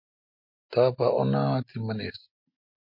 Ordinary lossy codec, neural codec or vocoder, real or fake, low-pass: MP3, 32 kbps; none; real; 5.4 kHz